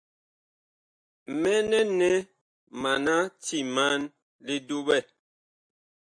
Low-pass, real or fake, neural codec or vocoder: 9.9 kHz; real; none